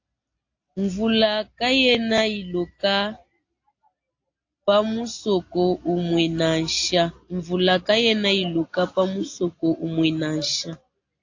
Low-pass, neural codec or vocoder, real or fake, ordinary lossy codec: 7.2 kHz; none; real; AAC, 48 kbps